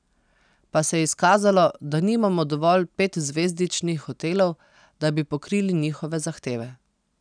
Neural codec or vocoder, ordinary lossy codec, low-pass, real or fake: none; none; 9.9 kHz; real